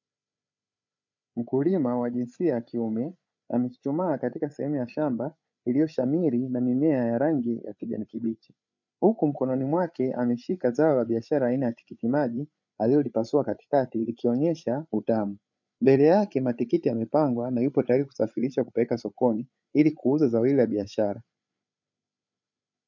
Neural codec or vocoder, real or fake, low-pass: codec, 16 kHz, 8 kbps, FreqCodec, larger model; fake; 7.2 kHz